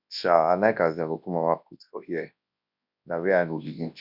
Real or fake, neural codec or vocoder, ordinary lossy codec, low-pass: fake; codec, 24 kHz, 0.9 kbps, WavTokenizer, large speech release; none; 5.4 kHz